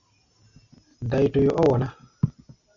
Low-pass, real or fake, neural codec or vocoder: 7.2 kHz; real; none